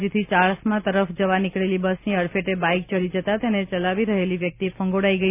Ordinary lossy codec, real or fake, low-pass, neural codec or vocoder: none; real; 3.6 kHz; none